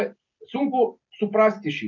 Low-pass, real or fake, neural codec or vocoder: 7.2 kHz; real; none